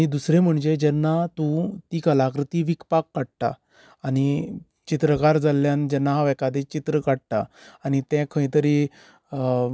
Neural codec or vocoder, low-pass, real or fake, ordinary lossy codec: none; none; real; none